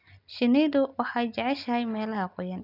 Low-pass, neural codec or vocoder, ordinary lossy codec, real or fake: 5.4 kHz; none; none; real